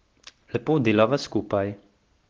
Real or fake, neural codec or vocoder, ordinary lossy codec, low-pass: real; none; Opus, 16 kbps; 7.2 kHz